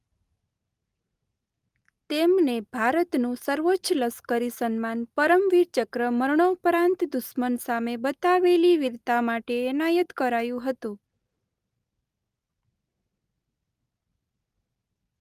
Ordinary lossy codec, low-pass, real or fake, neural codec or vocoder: Opus, 32 kbps; 14.4 kHz; real; none